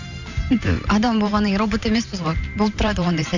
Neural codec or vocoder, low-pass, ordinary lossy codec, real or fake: vocoder, 44.1 kHz, 128 mel bands every 512 samples, BigVGAN v2; 7.2 kHz; none; fake